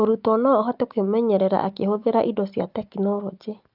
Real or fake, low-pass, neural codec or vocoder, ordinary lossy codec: real; 5.4 kHz; none; Opus, 24 kbps